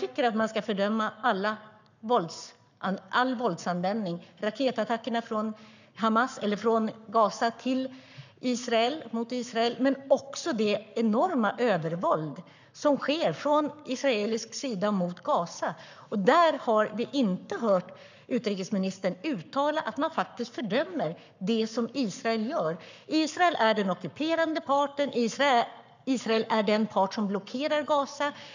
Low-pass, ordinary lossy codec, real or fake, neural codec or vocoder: 7.2 kHz; none; fake; codec, 44.1 kHz, 7.8 kbps, Pupu-Codec